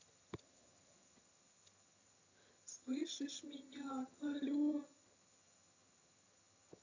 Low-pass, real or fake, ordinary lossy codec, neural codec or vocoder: 7.2 kHz; fake; AAC, 48 kbps; vocoder, 22.05 kHz, 80 mel bands, HiFi-GAN